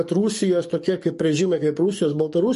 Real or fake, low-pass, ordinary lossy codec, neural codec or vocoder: fake; 14.4 kHz; MP3, 48 kbps; codec, 44.1 kHz, 7.8 kbps, DAC